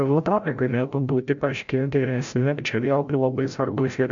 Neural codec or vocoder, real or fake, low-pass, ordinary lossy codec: codec, 16 kHz, 0.5 kbps, FreqCodec, larger model; fake; 7.2 kHz; MP3, 64 kbps